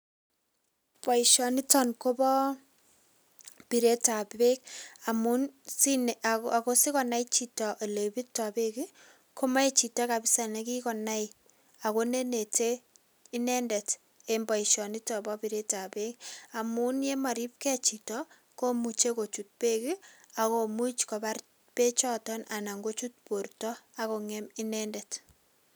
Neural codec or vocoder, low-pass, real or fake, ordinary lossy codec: none; none; real; none